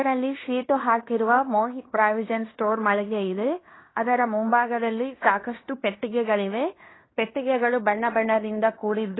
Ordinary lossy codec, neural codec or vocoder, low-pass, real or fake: AAC, 16 kbps; codec, 16 kHz in and 24 kHz out, 0.9 kbps, LongCat-Audio-Codec, fine tuned four codebook decoder; 7.2 kHz; fake